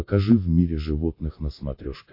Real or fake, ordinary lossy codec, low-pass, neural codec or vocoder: real; MP3, 32 kbps; 5.4 kHz; none